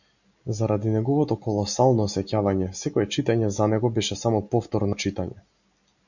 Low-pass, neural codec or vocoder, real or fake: 7.2 kHz; none; real